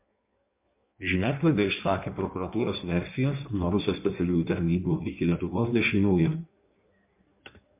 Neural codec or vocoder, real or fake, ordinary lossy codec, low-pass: codec, 16 kHz in and 24 kHz out, 1.1 kbps, FireRedTTS-2 codec; fake; MP3, 32 kbps; 3.6 kHz